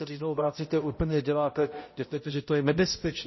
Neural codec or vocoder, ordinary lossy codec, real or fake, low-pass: codec, 16 kHz, 0.5 kbps, X-Codec, HuBERT features, trained on balanced general audio; MP3, 24 kbps; fake; 7.2 kHz